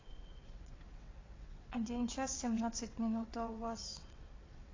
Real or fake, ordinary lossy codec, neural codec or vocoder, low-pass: fake; AAC, 32 kbps; vocoder, 22.05 kHz, 80 mel bands, WaveNeXt; 7.2 kHz